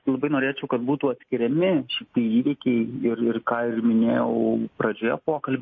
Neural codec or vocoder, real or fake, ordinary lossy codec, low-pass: none; real; MP3, 32 kbps; 7.2 kHz